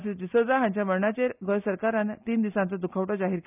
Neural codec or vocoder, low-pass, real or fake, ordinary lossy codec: none; 3.6 kHz; real; none